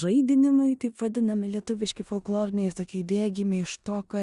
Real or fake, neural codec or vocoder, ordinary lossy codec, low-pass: fake; codec, 16 kHz in and 24 kHz out, 0.9 kbps, LongCat-Audio-Codec, four codebook decoder; Opus, 64 kbps; 10.8 kHz